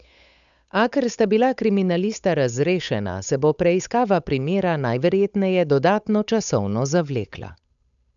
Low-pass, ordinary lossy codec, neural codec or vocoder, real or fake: 7.2 kHz; none; codec, 16 kHz, 8 kbps, FunCodec, trained on Chinese and English, 25 frames a second; fake